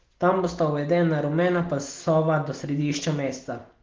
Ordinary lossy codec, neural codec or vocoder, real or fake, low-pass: Opus, 16 kbps; none; real; 7.2 kHz